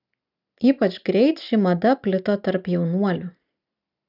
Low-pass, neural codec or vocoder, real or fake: 5.4 kHz; none; real